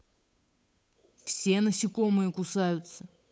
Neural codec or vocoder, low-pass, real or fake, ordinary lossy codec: codec, 16 kHz, 8 kbps, FunCodec, trained on Chinese and English, 25 frames a second; none; fake; none